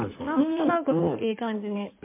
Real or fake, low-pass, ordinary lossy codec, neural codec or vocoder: fake; 3.6 kHz; MP3, 32 kbps; codec, 16 kHz in and 24 kHz out, 1.1 kbps, FireRedTTS-2 codec